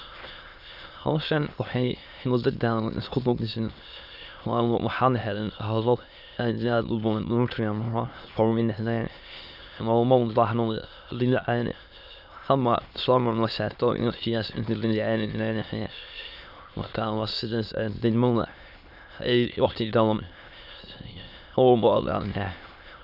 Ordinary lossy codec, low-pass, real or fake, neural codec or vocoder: none; 5.4 kHz; fake; autoencoder, 22.05 kHz, a latent of 192 numbers a frame, VITS, trained on many speakers